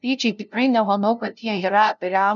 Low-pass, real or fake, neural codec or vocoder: 7.2 kHz; fake; codec, 16 kHz, 0.5 kbps, FunCodec, trained on LibriTTS, 25 frames a second